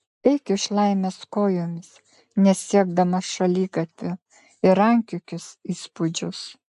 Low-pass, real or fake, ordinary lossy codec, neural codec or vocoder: 10.8 kHz; real; AAC, 96 kbps; none